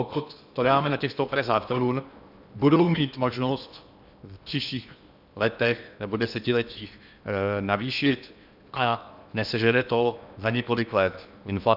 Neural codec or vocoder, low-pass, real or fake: codec, 16 kHz in and 24 kHz out, 0.8 kbps, FocalCodec, streaming, 65536 codes; 5.4 kHz; fake